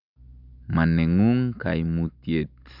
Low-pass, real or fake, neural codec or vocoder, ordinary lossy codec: 5.4 kHz; real; none; none